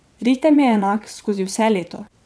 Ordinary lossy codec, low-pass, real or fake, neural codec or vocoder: none; none; fake; vocoder, 22.05 kHz, 80 mel bands, WaveNeXt